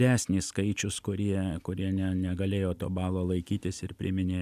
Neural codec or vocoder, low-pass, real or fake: none; 14.4 kHz; real